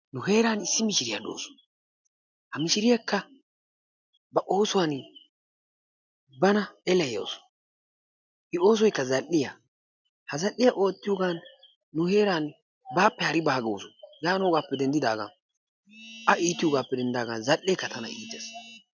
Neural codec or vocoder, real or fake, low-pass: none; real; 7.2 kHz